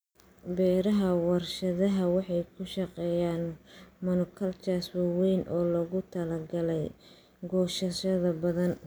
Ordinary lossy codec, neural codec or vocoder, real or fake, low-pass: none; none; real; none